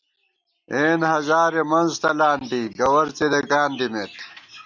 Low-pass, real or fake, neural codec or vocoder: 7.2 kHz; real; none